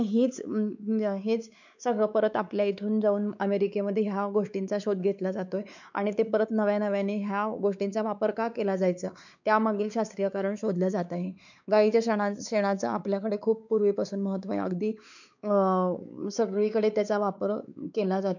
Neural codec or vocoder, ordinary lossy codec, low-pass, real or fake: codec, 16 kHz, 4 kbps, X-Codec, WavLM features, trained on Multilingual LibriSpeech; none; 7.2 kHz; fake